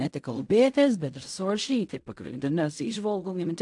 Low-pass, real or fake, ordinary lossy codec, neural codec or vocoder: 10.8 kHz; fake; AAC, 64 kbps; codec, 16 kHz in and 24 kHz out, 0.4 kbps, LongCat-Audio-Codec, fine tuned four codebook decoder